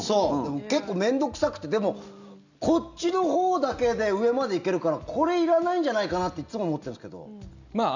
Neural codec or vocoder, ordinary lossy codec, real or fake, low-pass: none; none; real; 7.2 kHz